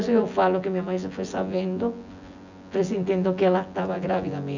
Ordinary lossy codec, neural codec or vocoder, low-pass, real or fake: none; vocoder, 24 kHz, 100 mel bands, Vocos; 7.2 kHz; fake